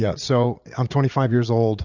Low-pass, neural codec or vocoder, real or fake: 7.2 kHz; vocoder, 44.1 kHz, 80 mel bands, Vocos; fake